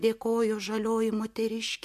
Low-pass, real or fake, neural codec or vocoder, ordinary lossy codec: 14.4 kHz; real; none; MP3, 64 kbps